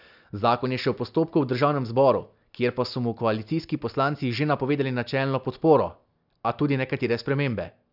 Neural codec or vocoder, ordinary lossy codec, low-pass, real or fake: none; none; 5.4 kHz; real